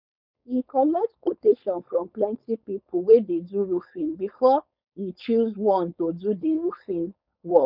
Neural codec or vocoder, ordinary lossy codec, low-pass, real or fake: codec, 16 kHz, 4.8 kbps, FACodec; none; 5.4 kHz; fake